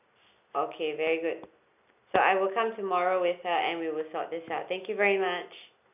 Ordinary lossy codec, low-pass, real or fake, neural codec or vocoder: none; 3.6 kHz; real; none